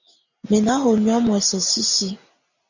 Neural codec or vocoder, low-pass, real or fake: none; 7.2 kHz; real